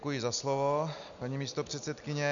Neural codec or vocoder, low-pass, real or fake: none; 7.2 kHz; real